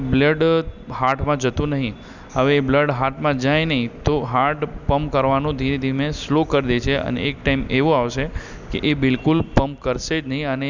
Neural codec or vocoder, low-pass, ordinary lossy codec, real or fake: none; 7.2 kHz; none; real